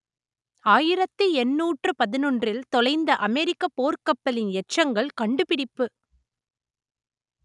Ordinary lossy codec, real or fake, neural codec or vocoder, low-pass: none; real; none; 10.8 kHz